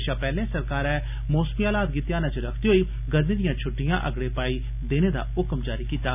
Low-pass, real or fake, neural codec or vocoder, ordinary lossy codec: 3.6 kHz; real; none; none